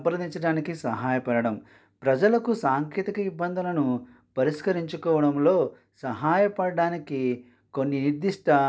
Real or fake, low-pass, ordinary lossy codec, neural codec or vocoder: real; none; none; none